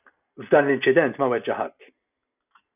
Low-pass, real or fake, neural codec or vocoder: 3.6 kHz; real; none